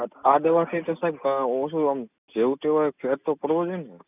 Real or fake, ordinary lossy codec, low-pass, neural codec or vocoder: real; none; 3.6 kHz; none